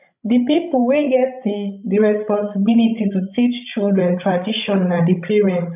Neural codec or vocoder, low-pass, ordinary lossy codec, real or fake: codec, 16 kHz, 16 kbps, FreqCodec, larger model; 3.6 kHz; none; fake